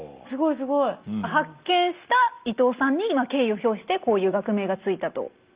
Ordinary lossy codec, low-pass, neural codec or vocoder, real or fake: Opus, 24 kbps; 3.6 kHz; none; real